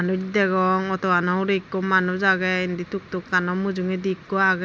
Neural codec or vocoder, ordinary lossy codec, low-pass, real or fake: none; none; none; real